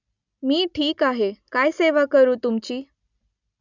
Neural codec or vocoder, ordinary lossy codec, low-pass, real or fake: none; none; 7.2 kHz; real